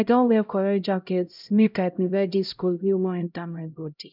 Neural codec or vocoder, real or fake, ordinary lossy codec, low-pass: codec, 16 kHz, 0.5 kbps, X-Codec, HuBERT features, trained on LibriSpeech; fake; none; 5.4 kHz